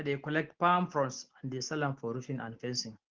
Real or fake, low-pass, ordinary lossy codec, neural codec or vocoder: real; 7.2 kHz; Opus, 16 kbps; none